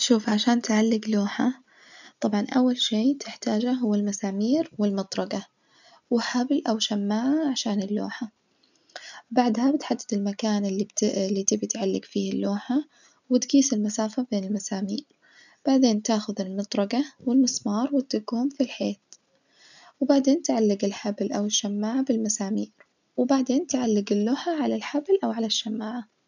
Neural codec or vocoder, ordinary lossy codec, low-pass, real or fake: none; none; 7.2 kHz; real